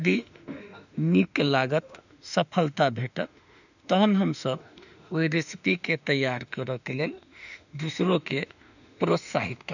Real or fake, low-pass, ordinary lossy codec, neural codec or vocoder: fake; 7.2 kHz; none; autoencoder, 48 kHz, 32 numbers a frame, DAC-VAE, trained on Japanese speech